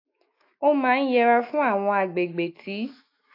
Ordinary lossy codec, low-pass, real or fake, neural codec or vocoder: AAC, 32 kbps; 5.4 kHz; fake; autoencoder, 48 kHz, 128 numbers a frame, DAC-VAE, trained on Japanese speech